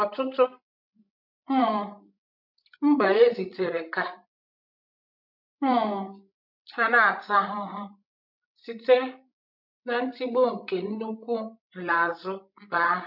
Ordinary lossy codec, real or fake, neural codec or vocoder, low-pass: none; fake; codec, 16 kHz, 16 kbps, FreqCodec, larger model; 5.4 kHz